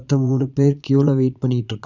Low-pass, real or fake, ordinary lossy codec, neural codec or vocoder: 7.2 kHz; fake; none; vocoder, 22.05 kHz, 80 mel bands, Vocos